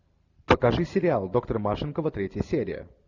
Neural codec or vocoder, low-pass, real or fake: none; 7.2 kHz; real